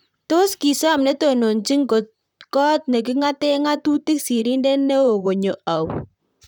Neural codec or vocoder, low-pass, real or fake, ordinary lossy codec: vocoder, 44.1 kHz, 128 mel bands, Pupu-Vocoder; 19.8 kHz; fake; none